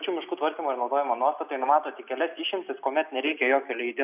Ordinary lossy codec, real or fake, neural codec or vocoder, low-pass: AAC, 32 kbps; real; none; 3.6 kHz